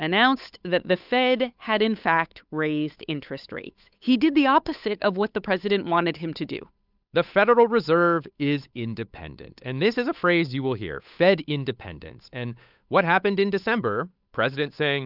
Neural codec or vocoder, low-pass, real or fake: codec, 16 kHz, 8 kbps, FunCodec, trained on Chinese and English, 25 frames a second; 5.4 kHz; fake